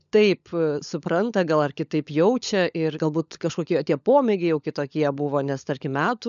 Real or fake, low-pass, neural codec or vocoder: fake; 7.2 kHz; codec, 16 kHz, 16 kbps, FunCodec, trained on LibriTTS, 50 frames a second